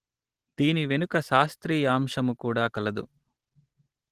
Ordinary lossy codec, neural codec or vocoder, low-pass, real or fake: Opus, 16 kbps; none; 14.4 kHz; real